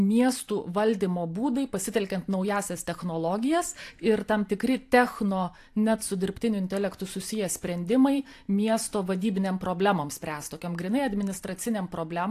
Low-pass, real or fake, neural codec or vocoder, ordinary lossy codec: 14.4 kHz; fake; vocoder, 44.1 kHz, 128 mel bands every 512 samples, BigVGAN v2; AAC, 64 kbps